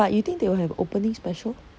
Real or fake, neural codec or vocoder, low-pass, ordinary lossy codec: real; none; none; none